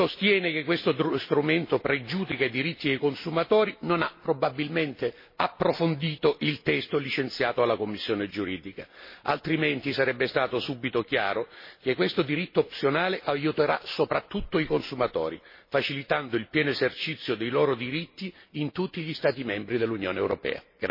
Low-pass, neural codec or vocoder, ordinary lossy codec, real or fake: 5.4 kHz; none; MP3, 24 kbps; real